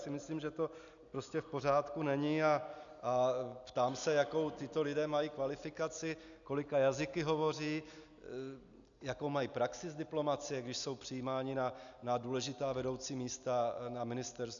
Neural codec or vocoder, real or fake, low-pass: none; real; 7.2 kHz